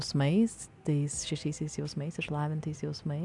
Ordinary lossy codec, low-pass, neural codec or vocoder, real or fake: MP3, 96 kbps; 10.8 kHz; none; real